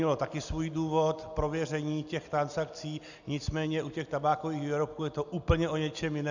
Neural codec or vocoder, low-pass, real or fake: none; 7.2 kHz; real